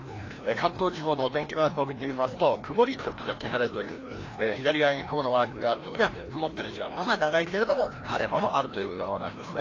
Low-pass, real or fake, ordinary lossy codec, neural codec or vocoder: 7.2 kHz; fake; AAC, 32 kbps; codec, 16 kHz, 1 kbps, FreqCodec, larger model